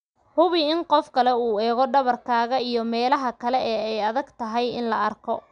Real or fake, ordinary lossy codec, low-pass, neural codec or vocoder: real; none; 9.9 kHz; none